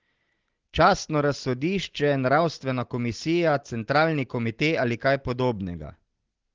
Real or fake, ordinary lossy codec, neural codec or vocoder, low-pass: real; Opus, 16 kbps; none; 7.2 kHz